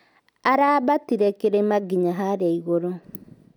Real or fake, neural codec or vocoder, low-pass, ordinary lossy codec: real; none; 19.8 kHz; none